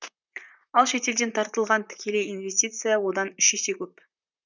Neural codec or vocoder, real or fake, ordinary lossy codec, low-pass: vocoder, 44.1 kHz, 128 mel bands, Pupu-Vocoder; fake; none; 7.2 kHz